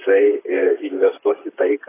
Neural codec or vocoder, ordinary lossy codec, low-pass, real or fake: vocoder, 44.1 kHz, 128 mel bands, Pupu-Vocoder; AAC, 16 kbps; 3.6 kHz; fake